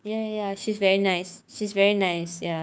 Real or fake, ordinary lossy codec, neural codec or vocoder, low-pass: fake; none; codec, 16 kHz, 6 kbps, DAC; none